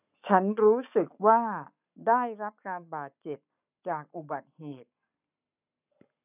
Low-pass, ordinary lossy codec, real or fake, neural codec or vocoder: 3.6 kHz; none; fake; codec, 44.1 kHz, 7.8 kbps, Pupu-Codec